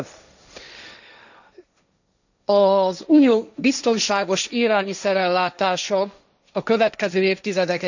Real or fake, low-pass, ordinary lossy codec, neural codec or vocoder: fake; 7.2 kHz; none; codec, 16 kHz, 1.1 kbps, Voila-Tokenizer